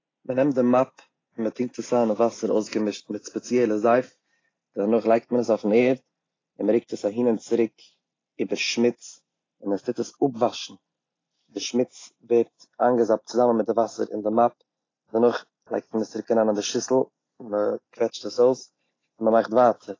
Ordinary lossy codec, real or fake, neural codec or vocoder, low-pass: AAC, 32 kbps; real; none; 7.2 kHz